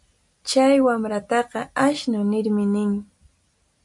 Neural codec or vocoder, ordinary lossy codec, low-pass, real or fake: none; MP3, 96 kbps; 10.8 kHz; real